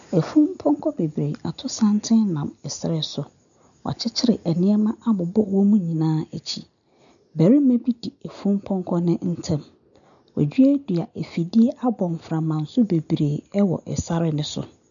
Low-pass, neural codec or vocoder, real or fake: 7.2 kHz; none; real